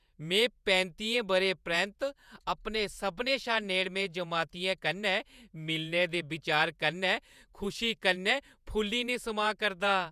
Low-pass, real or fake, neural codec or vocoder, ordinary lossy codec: 14.4 kHz; fake; vocoder, 48 kHz, 128 mel bands, Vocos; none